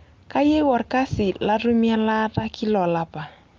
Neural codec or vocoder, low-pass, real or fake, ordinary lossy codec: none; 7.2 kHz; real; Opus, 24 kbps